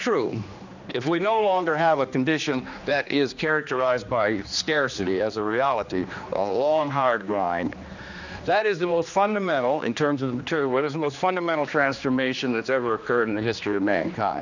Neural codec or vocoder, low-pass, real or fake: codec, 16 kHz, 2 kbps, X-Codec, HuBERT features, trained on general audio; 7.2 kHz; fake